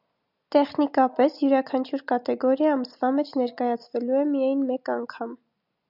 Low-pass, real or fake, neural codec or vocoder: 5.4 kHz; real; none